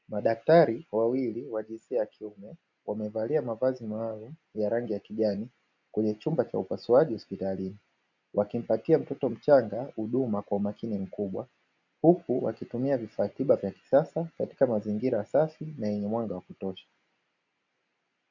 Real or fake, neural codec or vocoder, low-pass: real; none; 7.2 kHz